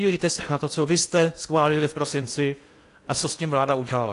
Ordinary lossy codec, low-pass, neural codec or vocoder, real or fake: AAC, 48 kbps; 10.8 kHz; codec, 16 kHz in and 24 kHz out, 0.8 kbps, FocalCodec, streaming, 65536 codes; fake